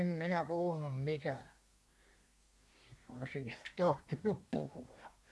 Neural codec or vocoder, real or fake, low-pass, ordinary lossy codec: codec, 24 kHz, 1 kbps, SNAC; fake; none; none